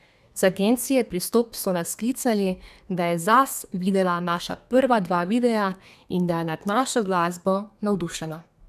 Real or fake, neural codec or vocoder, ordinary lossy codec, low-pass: fake; codec, 32 kHz, 1.9 kbps, SNAC; none; 14.4 kHz